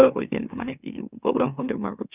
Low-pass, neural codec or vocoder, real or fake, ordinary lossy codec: 3.6 kHz; autoencoder, 44.1 kHz, a latent of 192 numbers a frame, MeloTTS; fake; none